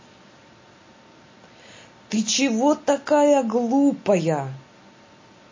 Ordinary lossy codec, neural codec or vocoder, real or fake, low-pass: MP3, 32 kbps; none; real; 7.2 kHz